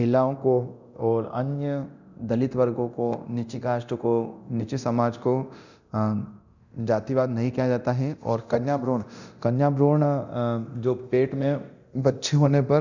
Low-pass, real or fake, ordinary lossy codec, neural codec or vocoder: 7.2 kHz; fake; none; codec, 24 kHz, 0.9 kbps, DualCodec